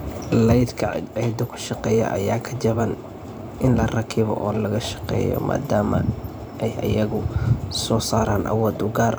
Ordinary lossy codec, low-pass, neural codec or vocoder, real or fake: none; none; vocoder, 44.1 kHz, 128 mel bands every 512 samples, BigVGAN v2; fake